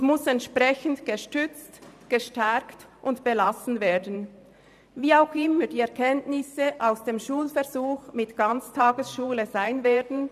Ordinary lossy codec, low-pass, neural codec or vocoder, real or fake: none; 14.4 kHz; vocoder, 44.1 kHz, 128 mel bands every 512 samples, BigVGAN v2; fake